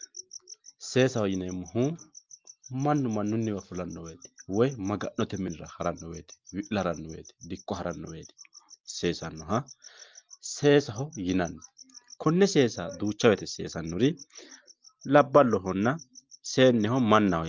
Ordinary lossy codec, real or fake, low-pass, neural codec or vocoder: Opus, 24 kbps; real; 7.2 kHz; none